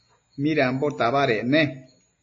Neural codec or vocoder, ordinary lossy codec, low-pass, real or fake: none; MP3, 32 kbps; 7.2 kHz; real